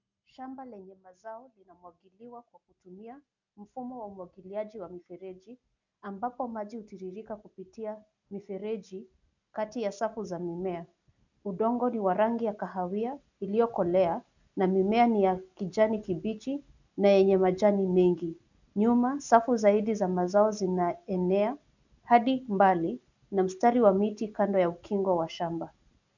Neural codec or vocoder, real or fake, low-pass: none; real; 7.2 kHz